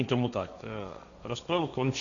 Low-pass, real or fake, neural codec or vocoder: 7.2 kHz; fake; codec, 16 kHz, 1.1 kbps, Voila-Tokenizer